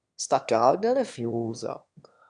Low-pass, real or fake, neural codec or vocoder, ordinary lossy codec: 9.9 kHz; fake; autoencoder, 22.05 kHz, a latent of 192 numbers a frame, VITS, trained on one speaker; MP3, 96 kbps